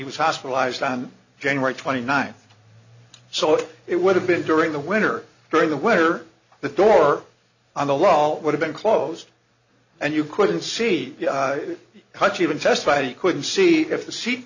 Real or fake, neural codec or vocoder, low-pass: real; none; 7.2 kHz